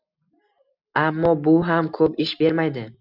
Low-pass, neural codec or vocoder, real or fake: 5.4 kHz; none; real